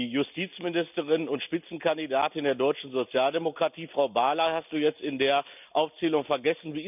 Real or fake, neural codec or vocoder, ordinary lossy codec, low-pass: real; none; none; 3.6 kHz